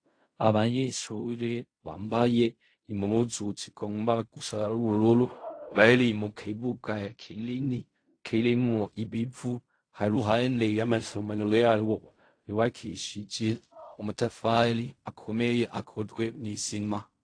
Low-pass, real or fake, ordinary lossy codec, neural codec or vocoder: 9.9 kHz; fake; AAC, 48 kbps; codec, 16 kHz in and 24 kHz out, 0.4 kbps, LongCat-Audio-Codec, fine tuned four codebook decoder